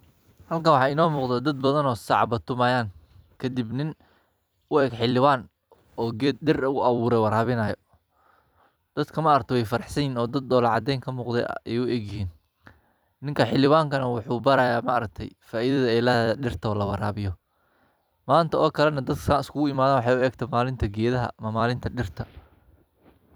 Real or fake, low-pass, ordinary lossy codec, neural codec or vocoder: fake; none; none; vocoder, 44.1 kHz, 128 mel bands every 256 samples, BigVGAN v2